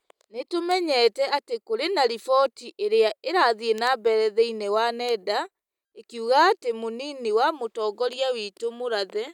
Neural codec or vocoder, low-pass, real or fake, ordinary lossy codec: none; 19.8 kHz; real; none